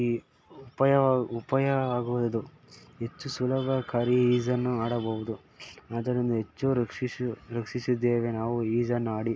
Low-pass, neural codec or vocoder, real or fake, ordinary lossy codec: none; none; real; none